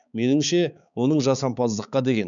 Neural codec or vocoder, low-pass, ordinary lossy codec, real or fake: codec, 16 kHz, 4 kbps, X-Codec, HuBERT features, trained on balanced general audio; 7.2 kHz; none; fake